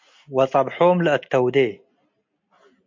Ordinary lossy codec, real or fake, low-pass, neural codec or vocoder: MP3, 48 kbps; real; 7.2 kHz; none